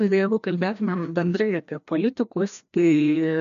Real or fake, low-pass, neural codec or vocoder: fake; 7.2 kHz; codec, 16 kHz, 1 kbps, FreqCodec, larger model